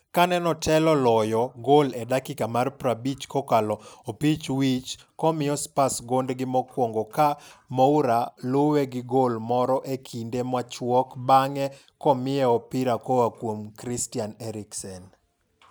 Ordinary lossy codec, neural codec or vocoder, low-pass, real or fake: none; none; none; real